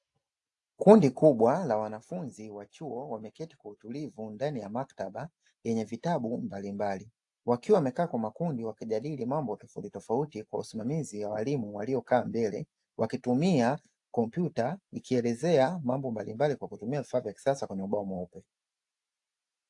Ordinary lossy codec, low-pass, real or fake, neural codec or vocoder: AAC, 64 kbps; 10.8 kHz; real; none